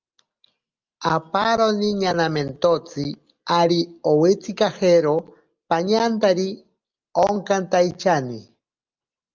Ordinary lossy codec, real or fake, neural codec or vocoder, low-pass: Opus, 24 kbps; real; none; 7.2 kHz